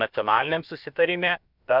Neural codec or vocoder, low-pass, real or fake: codec, 16 kHz, about 1 kbps, DyCAST, with the encoder's durations; 5.4 kHz; fake